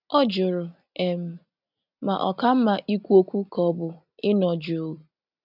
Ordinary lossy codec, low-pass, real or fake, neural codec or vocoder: none; 5.4 kHz; real; none